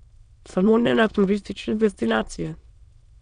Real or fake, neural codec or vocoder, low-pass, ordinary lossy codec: fake; autoencoder, 22.05 kHz, a latent of 192 numbers a frame, VITS, trained on many speakers; 9.9 kHz; none